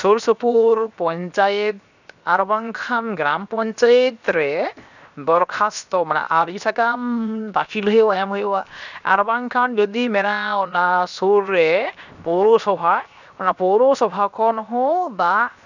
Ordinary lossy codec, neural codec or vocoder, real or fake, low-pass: none; codec, 16 kHz, 0.7 kbps, FocalCodec; fake; 7.2 kHz